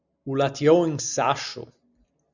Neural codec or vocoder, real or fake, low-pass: none; real; 7.2 kHz